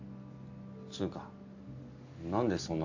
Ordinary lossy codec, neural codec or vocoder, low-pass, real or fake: AAC, 48 kbps; none; 7.2 kHz; real